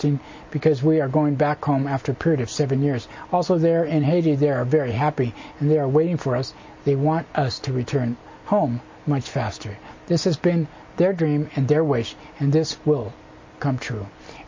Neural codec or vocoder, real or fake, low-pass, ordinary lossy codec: none; real; 7.2 kHz; MP3, 32 kbps